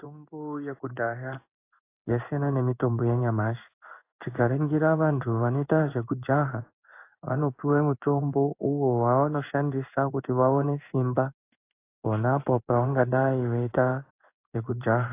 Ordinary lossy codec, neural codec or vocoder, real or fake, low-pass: AAC, 24 kbps; codec, 16 kHz in and 24 kHz out, 1 kbps, XY-Tokenizer; fake; 3.6 kHz